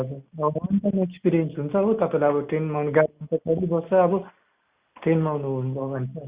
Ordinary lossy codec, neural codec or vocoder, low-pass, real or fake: Opus, 64 kbps; none; 3.6 kHz; real